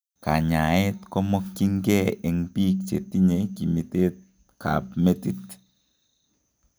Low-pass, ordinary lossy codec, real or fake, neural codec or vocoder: none; none; real; none